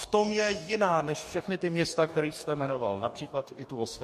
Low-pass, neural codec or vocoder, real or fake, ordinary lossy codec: 14.4 kHz; codec, 44.1 kHz, 2.6 kbps, DAC; fake; MP3, 64 kbps